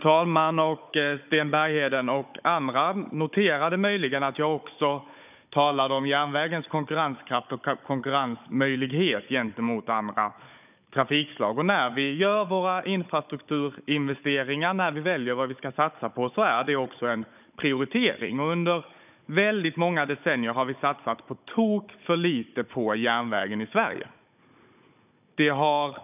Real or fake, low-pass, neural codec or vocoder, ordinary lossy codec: fake; 3.6 kHz; codec, 16 kHz, 16 kbps, FunCodec, trained on Chinese and English, 50 frames a second; none